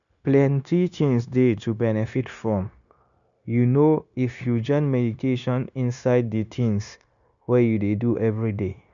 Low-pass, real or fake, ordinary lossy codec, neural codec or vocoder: 7.2 kHz; fake; none; codec, 16 kHz, 0.9 kbps, LongCat-Audio-Codec